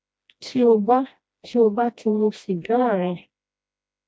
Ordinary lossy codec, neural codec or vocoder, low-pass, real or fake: none; codec, 16 kHz, 1 kbps, FreqCodec, smaller model; none; fake